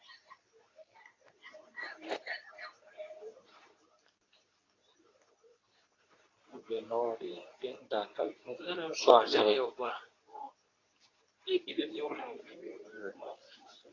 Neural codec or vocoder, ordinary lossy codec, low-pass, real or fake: codec, 24 kHz, 0.9 kbps, WavTokenizer, medium speech release version 2; AAC, 32 kbps; 7.2 kHz; fake